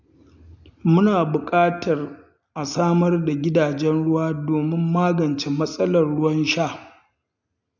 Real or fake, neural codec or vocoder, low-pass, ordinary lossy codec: real; none; 7.2 kHz; none